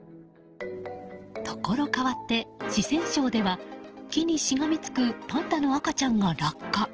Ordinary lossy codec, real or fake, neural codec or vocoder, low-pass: Opus, 16 kbps; real; none; 7.2 kHz